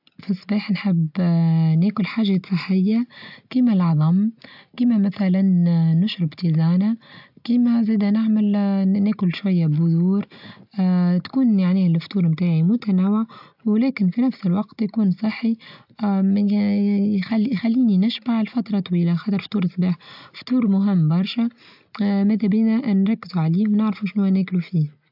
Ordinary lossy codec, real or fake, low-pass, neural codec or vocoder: AAC, 48 kbps; real; 5.4 kHz; none